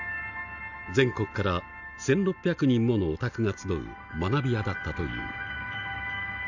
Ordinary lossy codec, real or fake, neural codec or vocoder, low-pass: none; real; none; 7.2 kHz